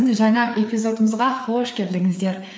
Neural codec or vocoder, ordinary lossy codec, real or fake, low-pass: codec, 16 kHz, 4 kbps, FreqCodec, larger model; none; fake; none